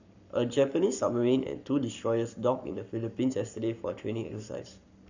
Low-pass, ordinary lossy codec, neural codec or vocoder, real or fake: 7.2 kHz; none; codec, 44.1 kHz, 7.8 kbps, Pupu-Codec; fake